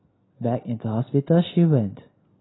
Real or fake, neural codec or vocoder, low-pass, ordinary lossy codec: real; none; 7.2 kHz; AAC, 16 kbps